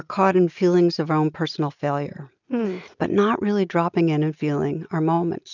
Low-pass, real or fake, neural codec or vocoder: 7.2 kHz; real; none